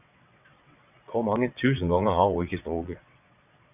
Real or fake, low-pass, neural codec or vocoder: fake; 3.6 kHz; vocoder, 22.05 kHz, 80 mel bands, WaveNeXt